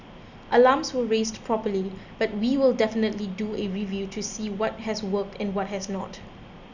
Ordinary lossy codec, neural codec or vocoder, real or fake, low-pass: none; none; real; 7.2 kHz